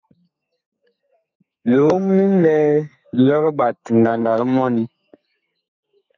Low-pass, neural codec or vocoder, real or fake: 7.2 kHz; codec, 32 kHz, 1.9 kbps, SNAC; fake